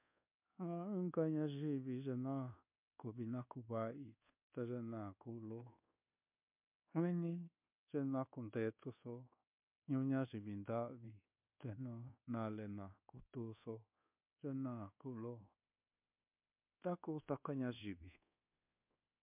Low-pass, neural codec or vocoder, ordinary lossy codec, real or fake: 3.6 kHz; codec, 24 kHz, 1.2 kbps, DualCodec; MP3, 32 kbps; fake